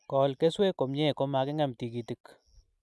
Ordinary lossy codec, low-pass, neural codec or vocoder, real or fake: none; none; none; real